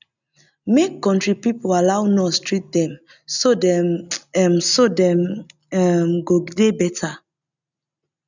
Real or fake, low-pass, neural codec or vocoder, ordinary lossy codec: real; 7.2 kHz; none; none